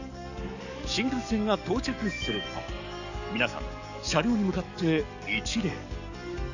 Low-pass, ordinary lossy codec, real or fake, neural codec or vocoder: 7.2 kHz; none; fake; codec, 44.1 kHz, 7.8 kbps, DAC